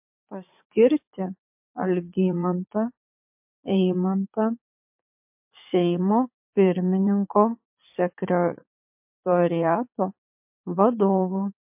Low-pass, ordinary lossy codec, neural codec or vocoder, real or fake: 3.6 kHz; MP3, 32 kbps; vocoder, 44.1 kHz, 80 mel bands, Vocos; fake